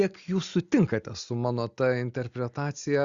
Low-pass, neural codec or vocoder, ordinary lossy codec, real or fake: 7.2 kHz; none; Opus, 64 kbps; real